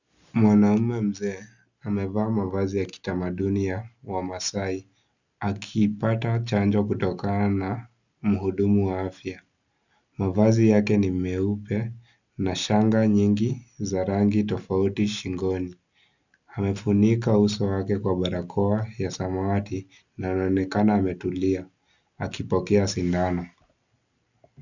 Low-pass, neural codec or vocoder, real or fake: 7.2 kHz; none; real